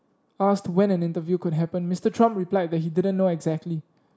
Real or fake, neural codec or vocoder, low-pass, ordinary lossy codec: real; none; none; none